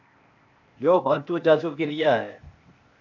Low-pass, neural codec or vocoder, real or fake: 7.2 kHz; codec, 16 kHz, 0.8 kbps, ZipCodec; fake